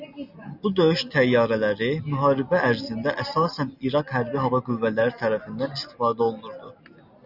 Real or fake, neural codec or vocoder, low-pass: real; none; 5.4 kHz